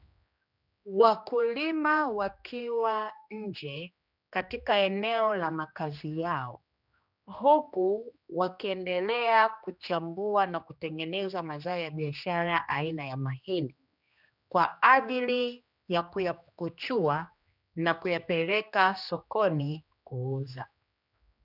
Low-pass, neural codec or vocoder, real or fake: 5.4 kHz; codec, 16 kHz, 2 kbps, X-Codec, HuBERT features, trained on general audio; fake